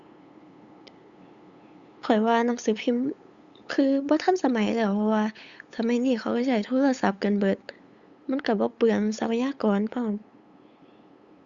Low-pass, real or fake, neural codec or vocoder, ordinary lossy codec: 7.2 kHz; fake; codec, 16 kHz, 8 kbps, FunCodec, trained on LibriTTS, 25 frames a second; Opus, 64 kbps